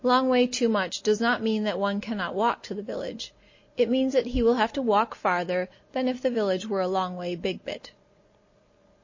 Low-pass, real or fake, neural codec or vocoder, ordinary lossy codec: 7.2 kHz; real; none; MP3, 32 kbps